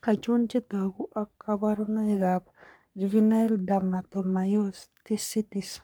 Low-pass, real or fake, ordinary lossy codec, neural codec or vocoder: none; fake; none; codec, 44.1 kHz, 3.4 kbps, Pupu-Codec